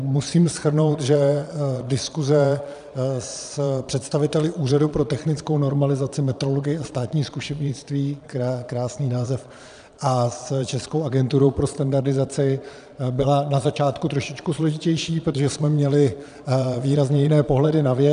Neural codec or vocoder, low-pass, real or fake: vocoder, 22.05 kHz, 80 mel bands, Vocos; 9.9 kHz; fake